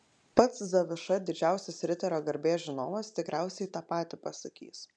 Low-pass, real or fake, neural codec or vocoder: 9.9 kHz; real; none